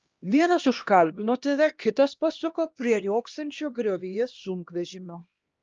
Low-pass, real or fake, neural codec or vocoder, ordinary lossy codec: 7.2 kHz; fake; codec, 16 kHz, 1 kbps, X-Codec, HuBERT features, trained on LibriSpeech; Opus, 24 kbps